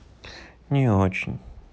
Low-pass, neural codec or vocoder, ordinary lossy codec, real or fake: none; none; none; real